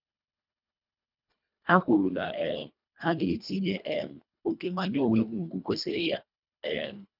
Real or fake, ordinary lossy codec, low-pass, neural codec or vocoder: fake; none; 5.4 kHz; codec, 24 kHz, 1.5 kbps, HILCodec